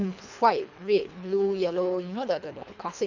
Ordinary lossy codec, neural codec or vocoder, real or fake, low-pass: none; codec, 24 kHz, 3 kbps, HILCodec; fake; 7.2 kHz